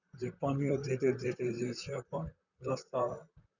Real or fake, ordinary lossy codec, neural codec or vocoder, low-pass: fake; none; vocoder, 44.1 kHz, 128 mel bands, Pupu-Vocoder; 7.2 kHz